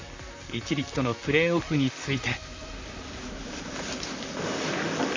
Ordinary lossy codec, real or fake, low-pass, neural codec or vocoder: MP3, 64 kbps; fake; 7.2 kHz; vocoder, 44.1 kHz, 128 mel bands, Pupu-Vocoder